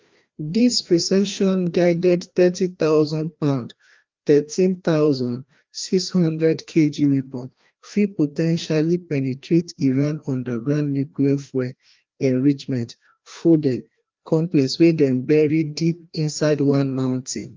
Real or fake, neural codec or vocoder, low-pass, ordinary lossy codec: fake; codec, 16 kHz, 1 kbps, FreqCodec, larger model; 7.2 kHz; Opus, 32 kbps